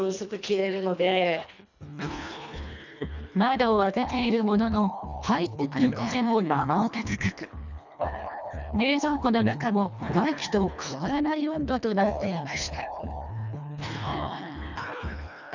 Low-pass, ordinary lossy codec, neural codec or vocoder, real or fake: 7.2 kHz; none; codec, 24 kHz, 1.5 kbps, HILCodec; fake